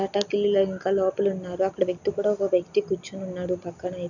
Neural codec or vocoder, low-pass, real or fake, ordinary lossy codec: none; 7.2 kHz; real; none